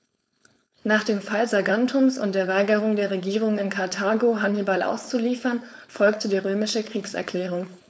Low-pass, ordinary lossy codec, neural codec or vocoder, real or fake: none; none; codec, 16 kHz, 4.8 kbps, FACodec; fake